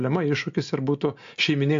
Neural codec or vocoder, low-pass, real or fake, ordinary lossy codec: none; 7.2 kHz; real; AAC, 64 kbps